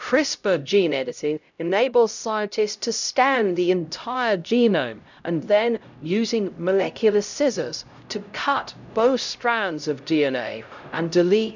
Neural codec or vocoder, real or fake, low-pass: codec, 16 kHz, 0.5 kbps, X-Codec, HuBERT features, trained on LibriSpeech; fake; 7.2 kHz